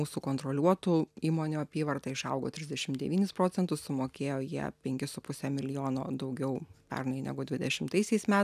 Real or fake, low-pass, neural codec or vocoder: real; 14.4 kHz; none